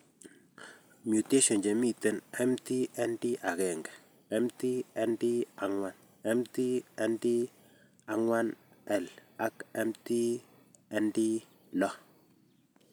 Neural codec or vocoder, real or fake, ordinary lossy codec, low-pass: none; real; none; none